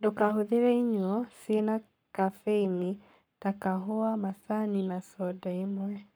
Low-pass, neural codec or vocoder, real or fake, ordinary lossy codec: none; codec, 44.1 kHz, 7.8 kbps, Pupu-Codec; fake; none